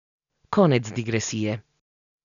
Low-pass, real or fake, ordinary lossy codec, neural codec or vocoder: 7.2 kHz; real; none; none